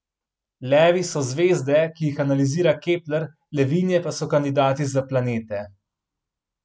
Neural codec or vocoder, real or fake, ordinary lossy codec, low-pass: none; real; none; none